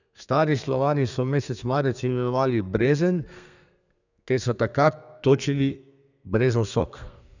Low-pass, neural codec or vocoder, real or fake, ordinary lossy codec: 7.2 kHz; codec, 32 kHz, 1.9 kbps, SNAC; fake; none